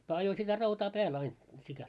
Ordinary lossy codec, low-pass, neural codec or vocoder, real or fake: none; none; none; real